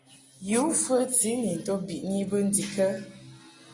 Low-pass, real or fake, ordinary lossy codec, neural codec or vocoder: 10.8 kHz; real; AAC, 32 kbps; none